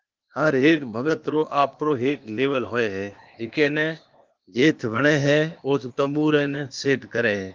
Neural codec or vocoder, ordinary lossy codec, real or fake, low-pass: codec, 16 kHz, 0.8 kbps, ZipCodec; Opus, 24 kbps; fake; 7.2 kHz